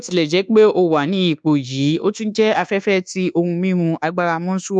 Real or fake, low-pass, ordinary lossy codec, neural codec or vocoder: fake; 9.9 kHz; none; codec, 24 kHz, 1.2 kbps, DualCodec